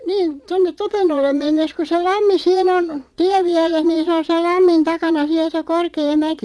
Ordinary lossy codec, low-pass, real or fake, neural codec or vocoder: none; none; fake; vocoder, 22.05 kHz, 80 mel bands, Vocos